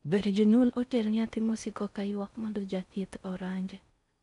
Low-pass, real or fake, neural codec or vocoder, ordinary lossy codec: 10.8 kHz; fake; codec, 16 kHz in and 24 kHz out, 0.6 kbps, FocalCodec, streaming, 4096 codes; none